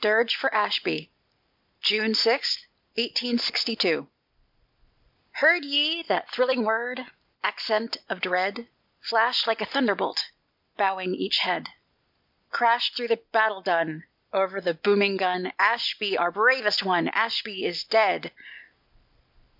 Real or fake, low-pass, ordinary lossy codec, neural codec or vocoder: real; 5.4 kHz; MP3, 48 kbps; none